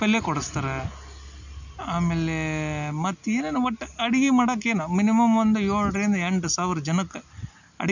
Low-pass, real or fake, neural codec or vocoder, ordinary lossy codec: 7.2 kHz; real; none; none